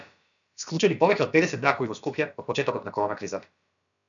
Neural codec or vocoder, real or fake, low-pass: codec, 16 kHz, about 1 kbps, DyCAST, with the encoder's durations; fake; 7.2 kHz